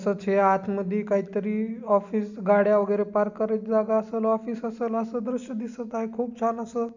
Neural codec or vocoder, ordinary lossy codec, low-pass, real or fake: none; none; 7.2 kHz; real